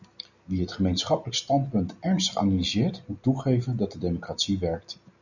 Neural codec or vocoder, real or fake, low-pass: none; real; 7.2 kHz